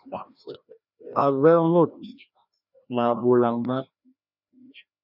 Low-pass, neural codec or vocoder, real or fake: 5.4 kHz; codec, 16 kHz, 1 kbps, FreqCodec, larger model; fake